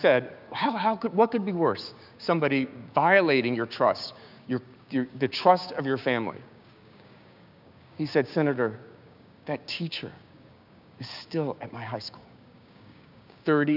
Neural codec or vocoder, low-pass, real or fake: codec, 16 kHz, 6 kbps, DAC; 5.4 kHz; fake